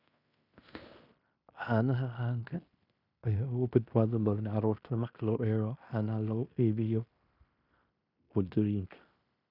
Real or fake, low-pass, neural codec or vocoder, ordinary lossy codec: fake; 5.4 kHz; codec, 16 kHz in and 24 kHz out, 0.9 kbps, LongCat-Audio-Codec, fine tuned four codebook decoder; none